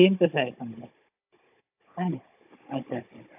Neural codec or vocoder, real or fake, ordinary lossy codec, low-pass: codec, 16 kHz, 16 kbps, FunCodec, trained on Chinese and English, 50 frames a second; fake; AAC, 32 kbps; 3.6 kHz